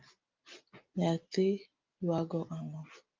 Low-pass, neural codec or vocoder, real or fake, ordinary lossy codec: 7.2 kHz; none; real; Opus, 24 kbps